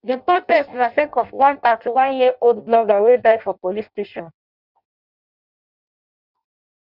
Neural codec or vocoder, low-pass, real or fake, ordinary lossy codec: codec, 16 kHz in and 24 kHz out, 0.6 kbps, FireRedTTS-2 codec; 5.4 kHz; fake; none